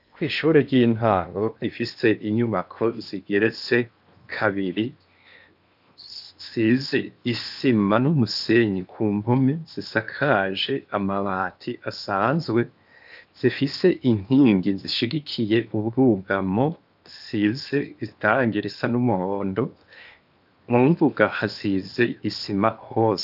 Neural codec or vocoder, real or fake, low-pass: codec, 16 kHz in and 24 kHz out, 0.8 kbps, FocalCodec, streaming, 65536 codes; fake; 5.4 kHz